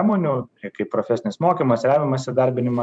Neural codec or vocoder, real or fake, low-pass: vocoder, 44.1 kHz, 128 mel bands every 512 samples, BigVGAN v2; fake; 9.9 kHz